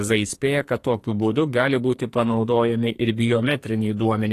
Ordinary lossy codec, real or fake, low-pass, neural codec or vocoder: AAC, 48 kbps; fake; 14.4 kHz; codec, 44.1 kHz, 2.6 kbps, SNAC